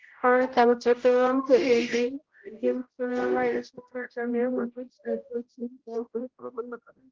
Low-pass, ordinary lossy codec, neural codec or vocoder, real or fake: 7.2 kHz; Opus, 16 kbps; codec, 16 kHz, 0.5 kbps, X-Codec, HuBERT features, trained on general audio; fake